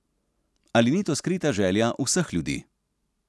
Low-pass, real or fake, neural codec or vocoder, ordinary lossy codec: none; real; none; none